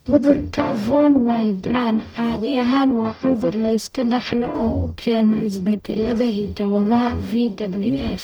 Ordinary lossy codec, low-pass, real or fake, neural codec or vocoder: none; none; fake; codec, 44.1 kHz, 0.9 kbps, DAC